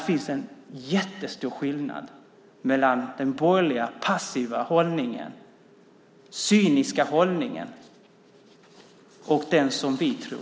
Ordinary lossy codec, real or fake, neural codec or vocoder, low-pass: none; real; none; none